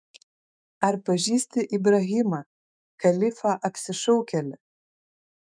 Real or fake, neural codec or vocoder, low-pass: fake; autoencoder, 48 kHz, 128 numbers a frame, DAC-VAE, trained on Japanese speech; 9.9 kHz